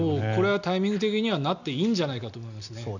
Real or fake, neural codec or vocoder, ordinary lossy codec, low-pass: real; none; none; 7.2 kHz